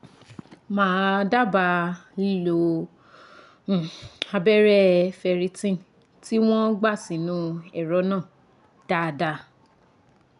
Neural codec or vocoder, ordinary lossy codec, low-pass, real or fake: none; none; 10.8 kHz; real